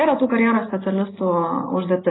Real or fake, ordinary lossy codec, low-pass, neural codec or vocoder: real; AAC, 16 kbps; 7.2 kHz; none